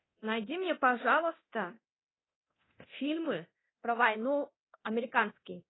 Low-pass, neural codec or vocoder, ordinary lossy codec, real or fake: 7.2 kHz; codec, 24 kHz, 0.9 kbps, DualCodec; AAC, 16 kbps; fake